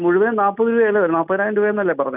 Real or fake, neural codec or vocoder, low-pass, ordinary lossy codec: real; none; 3.6 kHz; none